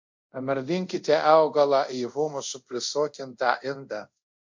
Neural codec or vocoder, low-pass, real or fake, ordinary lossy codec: codec, 24 kHz, 0.5 kbps, DualCodec; 7.2 kHz; fake; MP3, 48 kbps